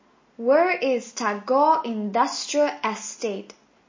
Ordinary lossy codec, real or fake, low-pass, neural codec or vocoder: MP3, 32 kbps; real; 7.2 kHz; none